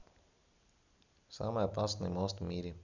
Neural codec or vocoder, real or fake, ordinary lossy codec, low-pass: none; real; none; 7.2 kHz